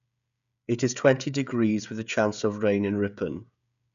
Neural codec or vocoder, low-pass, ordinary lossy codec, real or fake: codec, 16 kHz, 16 kbps, FreqCodec, smaller model; 7.2 kHz; none; fake